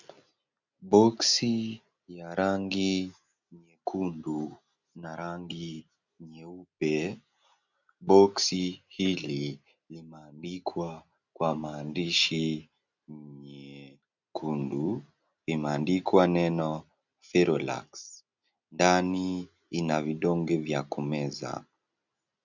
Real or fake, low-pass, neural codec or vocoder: real; 7.2 kHz; none